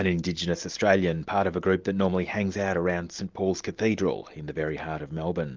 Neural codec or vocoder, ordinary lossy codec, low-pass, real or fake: none; Opus, 32 kbps; 7.2 kHz; real